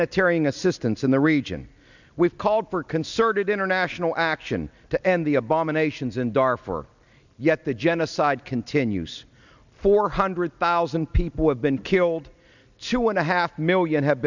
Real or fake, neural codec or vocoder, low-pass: real; none; 7.2 kHz